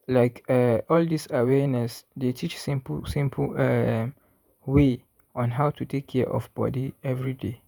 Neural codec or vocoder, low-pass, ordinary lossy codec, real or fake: vocoder, 48 kHz, 128 mel bands, Vocos; none; none; fake